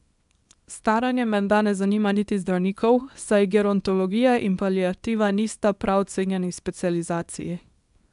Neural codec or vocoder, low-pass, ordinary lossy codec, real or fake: codec, 24 kHz, 0.9 kbps, WavTokenizer, small release; 10.8 kHz; none; fake